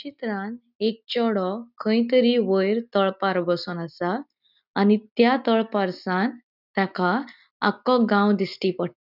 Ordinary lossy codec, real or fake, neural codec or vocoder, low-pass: none; real; none; 5.4 kHz